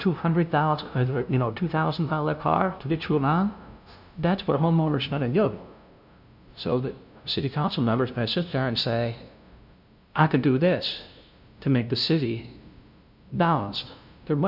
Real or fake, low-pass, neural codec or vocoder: fake; 5.4 kHz; codec, 16 kHz, 0.5 kbps, FunCodec, trained on LibriTTS, 25 frames a second